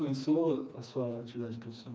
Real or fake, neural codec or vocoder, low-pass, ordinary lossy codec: fake; codec, 16 kHz, 2 kbps, FreqCodec, smaller model; none; none